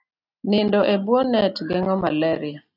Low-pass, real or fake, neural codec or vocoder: 5.4 kHz; real; none